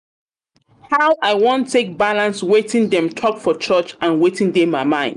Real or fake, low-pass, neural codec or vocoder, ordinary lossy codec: real; 10.8 kHz; none; none